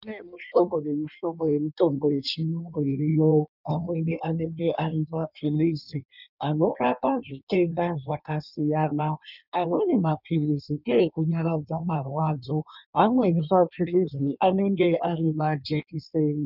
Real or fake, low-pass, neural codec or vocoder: fake; 5.4 kHz; codec, 16 kHz in and 24 kHz out, 1.1 kbps, FireRedTTS-2 codec